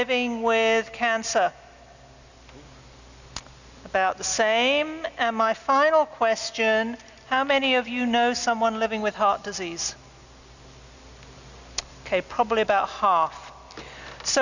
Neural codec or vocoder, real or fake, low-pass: none; real; 7.2 kHz